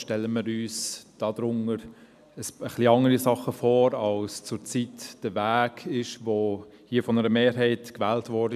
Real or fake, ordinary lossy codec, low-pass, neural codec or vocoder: real; none; 14.4 kHz; none